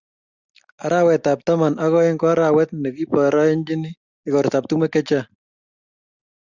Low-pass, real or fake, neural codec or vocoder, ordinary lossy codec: 7.2 kHz; real; none; Opus, 64 kbps